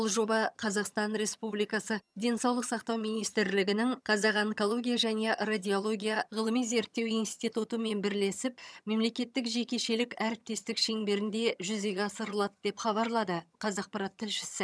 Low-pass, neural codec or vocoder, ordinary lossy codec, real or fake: none; vocoder, 22.05 kHz, 80 mel bands, HiFi-GAN; none; fake